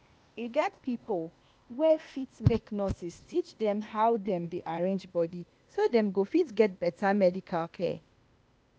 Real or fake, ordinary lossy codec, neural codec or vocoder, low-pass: fake; none; codec, 16 kHz, 0.8 kbps, ZipCodec; none